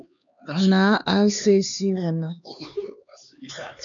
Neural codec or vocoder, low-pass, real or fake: codec, 16 kHz, 2 kbps, X-Codec, HuBERT features, trained on LibriSpeech; 7.2 kHz; fake